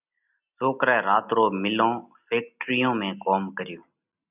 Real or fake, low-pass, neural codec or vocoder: real; 3.6 kHz; none